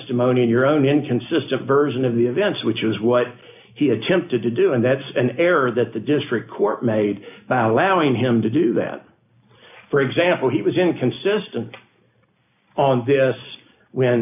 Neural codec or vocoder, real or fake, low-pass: none; real; 3.6 kHz